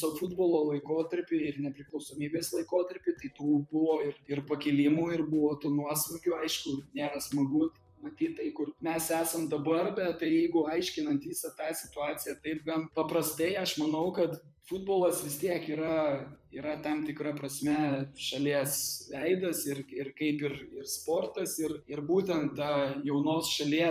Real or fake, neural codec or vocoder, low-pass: fake; vocoder, 44.1 kHz, 128 mel bands, Pupu-Vocoder; 14.4 kHz